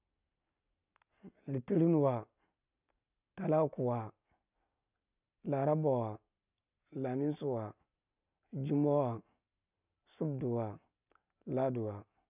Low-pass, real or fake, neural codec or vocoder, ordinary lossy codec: 3.6 kHz; real; none; none